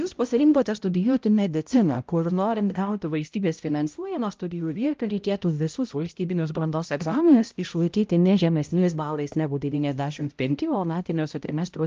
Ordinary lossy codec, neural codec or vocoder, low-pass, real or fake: Opus, 24 kbps; codec, 16 kHz, 0.5 kbps, X-Codec, HuBERT features, trained on balanced general audio; 7.2 kHz; fake